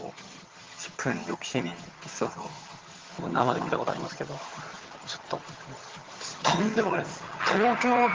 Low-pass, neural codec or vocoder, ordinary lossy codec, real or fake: 7.2 kHz; vocoder, 22.05 kHz, 80 mel bands, HiFi-GAN; Opus, 16 kbps; fake